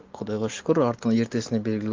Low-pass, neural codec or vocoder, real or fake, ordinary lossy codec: 7.2 kHz; none; real; Opus, 32 kbps